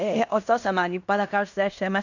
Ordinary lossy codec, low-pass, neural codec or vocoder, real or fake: none; 7.2 kHz; codec, 16 kHz in and 24 kHz out, 0.9 kbps, LongCat-Audio-Codec, fine tuned four codebook decoder; fake